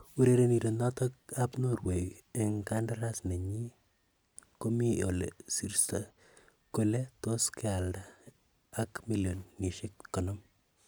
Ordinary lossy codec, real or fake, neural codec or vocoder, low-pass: none; real; none; none